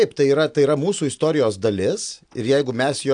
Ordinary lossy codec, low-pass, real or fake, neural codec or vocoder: AAC, 64 kbps; 9.9 kHz; real; none